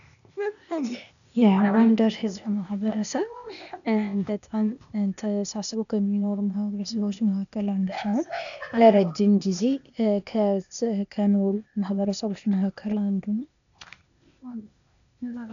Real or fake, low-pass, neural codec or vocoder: fake; 7.2 kHz; codec, 16 kHz, 0.8 kbps, ZipCodec